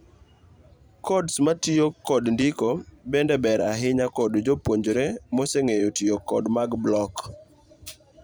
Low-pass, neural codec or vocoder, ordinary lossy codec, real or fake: none; vocoder, 44.1 kHz, 128 mel bands every 512 samples, BigVGAN v2; none; fake